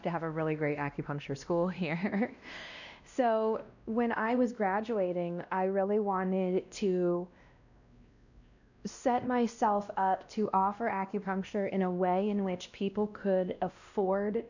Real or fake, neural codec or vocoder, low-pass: fake; codec, 16 kHz, 1 kbps, X-Codec, WavLM features, trained on Multilingual LibriSpeech; 7.2 kHz